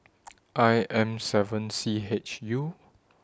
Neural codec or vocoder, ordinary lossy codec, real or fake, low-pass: none; none; real; none